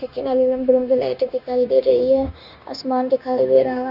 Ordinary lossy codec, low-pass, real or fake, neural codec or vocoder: none; 5.4 kHz; fake; codec, 16 kHz, 0.9 kbps, LongCat-Audio-Codec